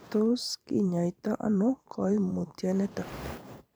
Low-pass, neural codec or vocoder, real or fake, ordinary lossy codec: none; vocoder, 44.1 kHz, 128 mel bands every 512 samples, BigVGAN v2; fake; none